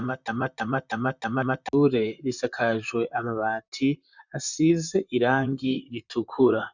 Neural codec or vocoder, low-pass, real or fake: vocoder, 44.1 kHz, 128 mel bands, Pupu-Vocoder; 7.2 kHz; fake